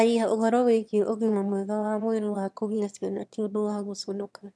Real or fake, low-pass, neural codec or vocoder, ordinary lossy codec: fake; none; autoencoder, 22.05 kHz, a latent of 192 numbers a frame, VITS, trained on one speaker; none